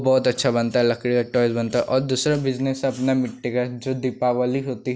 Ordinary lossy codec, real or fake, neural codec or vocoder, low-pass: none; real; none; none